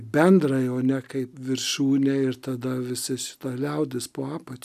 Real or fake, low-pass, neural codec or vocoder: real; 14.4 kHz; none